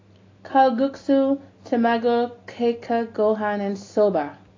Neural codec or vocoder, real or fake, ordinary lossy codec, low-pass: none; real; AAC, 32 kbps; 7.2 kHz